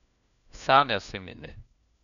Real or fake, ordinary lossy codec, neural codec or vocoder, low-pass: fake; none; codec, 16 kHz, 1 kbps, FunCodec, trained on LibriTTS, 50 frames a second; 7.2 kHz